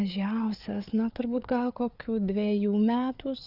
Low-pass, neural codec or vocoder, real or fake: 5.4 kHz; codec, 16 kHz, 4 kbps, FreqCodec, larger model; fake